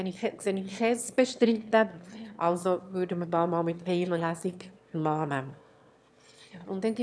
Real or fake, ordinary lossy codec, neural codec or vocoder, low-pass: fake; none; autoencoder, 22.05 kHz, a latent of 192 numbers a frame, VITS, trained on one speaker; none